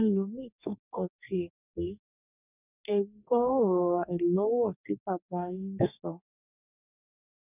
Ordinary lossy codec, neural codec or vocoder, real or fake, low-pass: none; codec, 44.1 kHz, 2.6 kbps, DAC; fake; 3.6 kHz